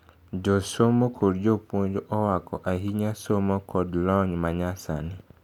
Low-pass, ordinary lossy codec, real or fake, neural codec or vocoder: 19.8 kHz; none; real; none